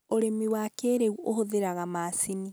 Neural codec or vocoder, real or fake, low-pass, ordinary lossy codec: none; real; none; none